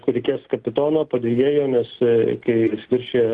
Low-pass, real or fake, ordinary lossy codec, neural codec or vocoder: 10.8 kHz; real; Opus, 24 kbps; none